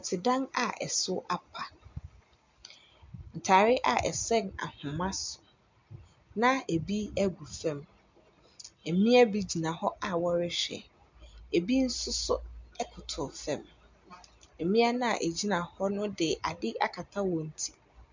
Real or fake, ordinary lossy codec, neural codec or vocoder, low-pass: real; MP3, 64 kbps; none; 7.2 kHz